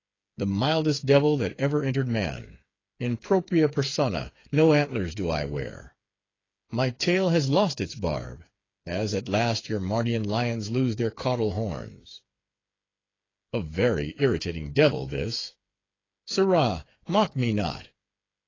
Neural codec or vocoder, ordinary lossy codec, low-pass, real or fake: codec, 16 kHz, 8 kbps, FreqCodec, smaller model; AAC, 32 kbps; 7.2 kHz; fake